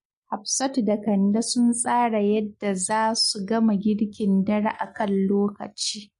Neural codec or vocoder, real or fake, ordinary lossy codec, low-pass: none; real; MP3, 48 kbps; 14.4 kHz